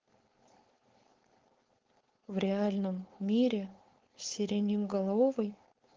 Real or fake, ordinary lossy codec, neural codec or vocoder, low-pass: fake; Opus, 16 kbps; codec, 16 kHz, 4.8 kbps, FACodec; 7.2 kHz